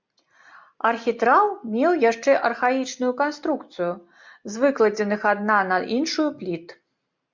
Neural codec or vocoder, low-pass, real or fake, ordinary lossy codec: none; 7.2 kHz; real; MP3, 64 kbps